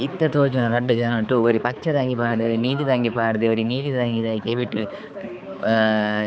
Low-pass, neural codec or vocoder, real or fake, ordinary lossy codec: none; codec, 16 kHz, 4 kbps, X-Codec, HuBERT features, trained on balanced general audio; fake; none